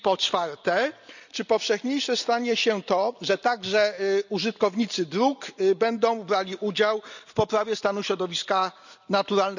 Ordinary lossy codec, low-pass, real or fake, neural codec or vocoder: none; 7.2 kHz; real; none